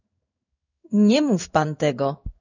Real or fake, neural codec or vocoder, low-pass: fake; codec, 16 kHz in and 24 kHz out, 1 kbps, XY-Tokenizer; 7.2 kHz